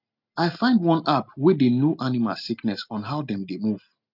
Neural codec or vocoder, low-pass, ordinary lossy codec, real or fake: none; 5.4 kHz; none; real